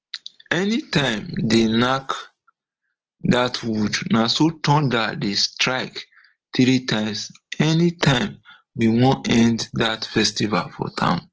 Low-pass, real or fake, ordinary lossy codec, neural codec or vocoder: 7.2 kHz; real; Opus, 16 kbps; none